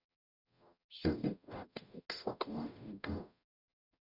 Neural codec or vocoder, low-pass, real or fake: codec, 44.1 kHz, 0.9 kbps, DAC; 5.4 kHz; fake